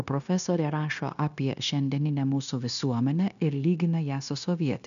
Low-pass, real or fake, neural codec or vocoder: 7.2 kHz; fake; codec, 16 kHz, 0.9 kbps, LongCat-Audio-Codec